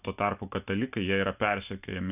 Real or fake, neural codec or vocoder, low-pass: real; none; 3.6 kHz